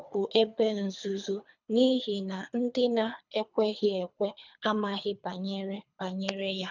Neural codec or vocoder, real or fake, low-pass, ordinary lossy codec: codec, 24 kHz, 3 kbps, HILCodec; fake; 7.2 kHz; none